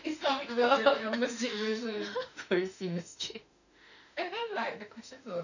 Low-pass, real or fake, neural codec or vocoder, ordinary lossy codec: 7.2 kHz; fake; autoencoder, 48 kHz, 32 numbers a frame, DAC-VAE, trained on Japanese speech; none